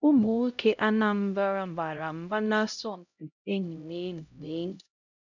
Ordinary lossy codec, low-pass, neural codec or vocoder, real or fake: none; 7.2 kHz; codec, 16 kHz, 0.5 kbps, X-Codec, HuBERT features, trained on LibriSpeech; fake